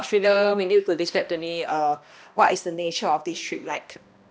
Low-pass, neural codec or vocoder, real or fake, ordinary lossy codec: none; codec, 16 kHz, 1 kbps, X-Codec, HuBERT features, trained on balanced general audio; fake; none